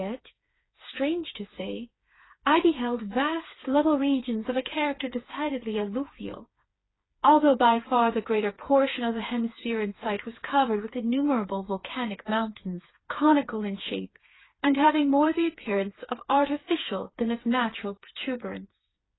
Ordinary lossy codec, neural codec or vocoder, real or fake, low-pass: AAC, 16 kbps; codec, 16 kHz, 4 kbps, FreqCodec, smaller model; fake; 7.2 kHz